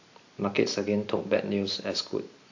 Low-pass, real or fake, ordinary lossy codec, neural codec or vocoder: 7.2 kHz; real; AAC, 48 kbps; none